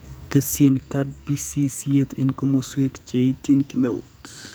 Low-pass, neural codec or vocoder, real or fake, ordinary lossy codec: none; codec, 44.1 kHz, 2.6 kbps, SNAC; fake; none